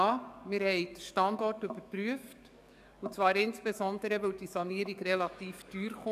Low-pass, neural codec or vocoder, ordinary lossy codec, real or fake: 14.4 kHz; codec, 44.1 kHz, 7.8 kbps, DAC; none; fake